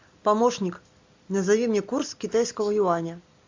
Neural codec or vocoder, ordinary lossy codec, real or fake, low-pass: none; MP3, 64 kbps; real; 7.2 kHz